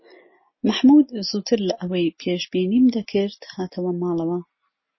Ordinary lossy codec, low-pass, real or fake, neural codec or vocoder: MP3, 24 kbps; 7.2 kHz; real; none